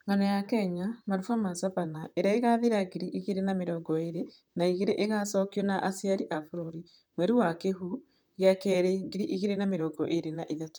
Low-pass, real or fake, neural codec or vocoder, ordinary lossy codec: none; fake; vocoder, 44.1 kHz, 128 mel bands, Pupu-Vocoder; none